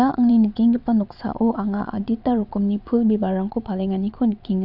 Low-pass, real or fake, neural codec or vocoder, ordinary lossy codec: 5.4 kHz; fake; vocoder, 22.05 kHz, 80 mel bands, WaveNeXt; AAC, 48 kbps